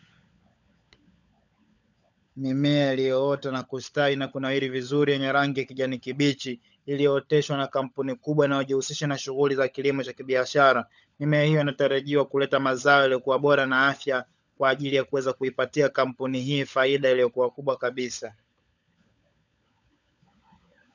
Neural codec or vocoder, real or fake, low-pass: codec, 16 kHz, 16 kbps, FunCodec, trained on LibriTTS, 50 frames a second; fake; 7.2 kHz